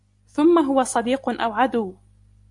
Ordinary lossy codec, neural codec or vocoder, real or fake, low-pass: AAC, 64 kbps; none; real; 10.8 kHz